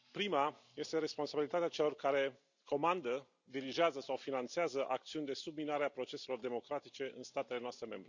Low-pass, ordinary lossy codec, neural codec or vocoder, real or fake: 7.2 kHz; none; none; real